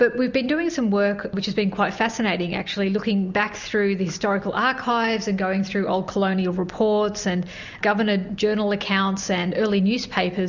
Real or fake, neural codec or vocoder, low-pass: real; none; 7.2 kHz